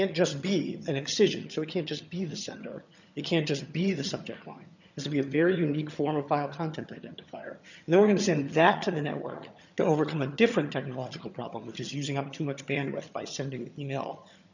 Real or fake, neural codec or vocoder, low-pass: fake; vocoder, 22.05 kHz, 80 mel bands, HiFi-GAN; 7.2 kHz